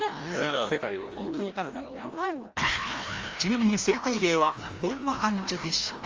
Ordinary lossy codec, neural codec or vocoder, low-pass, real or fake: Opus, 32 kbps; codec, 16 kHz, 1 kbps, FreqCodec, larger model; 7.2 kHz; fake